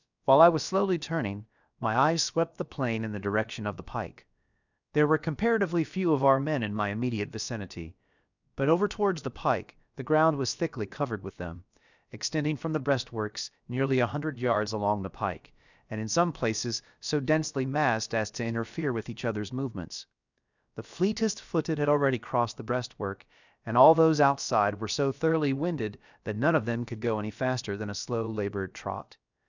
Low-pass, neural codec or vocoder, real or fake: 7.2 kHz; codec, 16 kHz, about 1 kbps, DyCAST, with the encoder's durations; fake